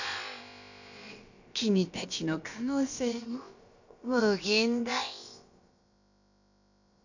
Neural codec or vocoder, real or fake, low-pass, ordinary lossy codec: codec, 16 kHz, about 1 kbps, DyCAST, with the encoder's durations; fake; 7.2 kHz; none